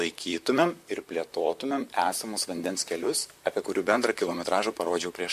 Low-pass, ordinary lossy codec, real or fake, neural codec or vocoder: 14.4 kHz; MP3, 64 kbps; fake; vocoder, 44.1 kHz, 128 mel bands, Pupu-Vocoder